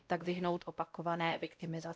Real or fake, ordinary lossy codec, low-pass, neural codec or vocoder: fake; none; none; codec, 16 kHz, 0.5 kbps, X-Codec, WavLM features, trained on Multilingual LibriSpeech